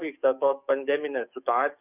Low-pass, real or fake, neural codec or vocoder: 3.6 kHz; fake; codec, 44.1 kHz, 7.8 kbps, DAC